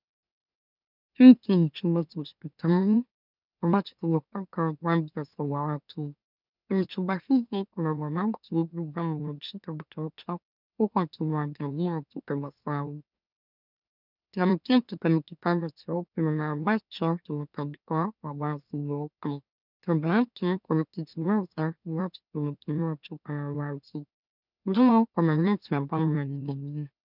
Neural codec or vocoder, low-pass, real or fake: autoencoder, 44.1 kHz, a latent of 192 numbers a frame, MeloTTS; 5.4 kHz; fake